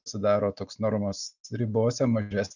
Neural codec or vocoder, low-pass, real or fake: none; 7.2 kHz; real